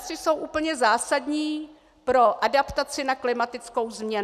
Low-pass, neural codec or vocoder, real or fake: 14.4 kHz; none; real